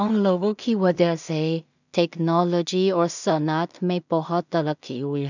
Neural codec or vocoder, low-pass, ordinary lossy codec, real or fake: codec, 16 kHz in and 24 kHz out, 0.4 kbps, LongCat-Audio-Codec, two codebook decoder; 7.2 kHz; none; fake